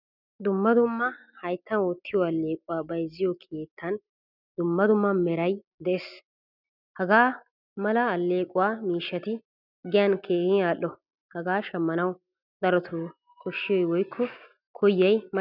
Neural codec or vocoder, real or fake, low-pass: none; real; 5.4 kHz